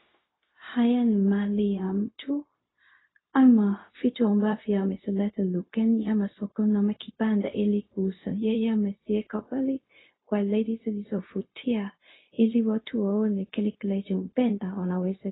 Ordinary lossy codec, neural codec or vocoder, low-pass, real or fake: AAC, 16 kbps; codec, 16 kHz, 0.4 kbps, LongCat-Audio-Codec; 7.2 kHz; fake